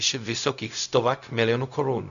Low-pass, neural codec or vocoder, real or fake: 7.2 kHz; codec, 16 kHz, 0.4 kbps, LongCat-Audio-Codec; fake